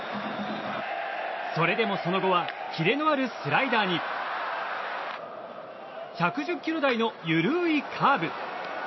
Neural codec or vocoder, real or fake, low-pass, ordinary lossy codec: none; real; 7.2 kHz; MP3, 24 kbps